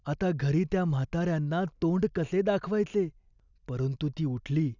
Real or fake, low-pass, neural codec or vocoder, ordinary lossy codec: real; 7.2 kHz; none; none